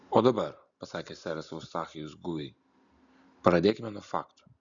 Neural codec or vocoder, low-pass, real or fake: none; 7.2 kHz; real